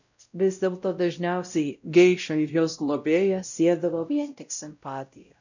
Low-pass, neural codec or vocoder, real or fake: 7.2 kHz; codec, 16 kHz, 0.5 kbps, X-Codec, WavLM features, trained on Multilingual LibriSpeech; fake